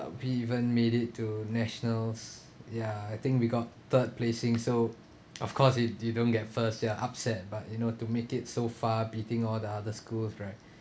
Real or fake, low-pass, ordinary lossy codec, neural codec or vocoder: real; none; none; none